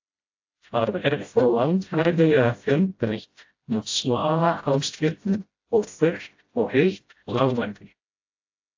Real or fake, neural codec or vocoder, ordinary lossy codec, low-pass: fake; codec, 16 kHz, 0.5 kbps, FreqCodec, smaller model; AAC, 48 kbps; 7.2 kHz